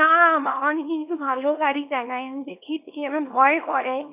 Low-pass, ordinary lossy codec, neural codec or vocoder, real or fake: 3.6 kHz; none; codec, 24 kHz, 0.9 kbps, WavTokenizer, small release; fake